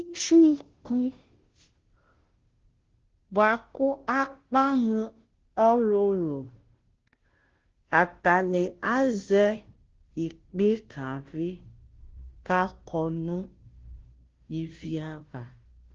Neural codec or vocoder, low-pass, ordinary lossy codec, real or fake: codec, 16 kHz, 0.5 kbps, FunCodec, trained on Chinese and English, 25 frames a second; 7.2 kHz; Opus, 16 kbps; fake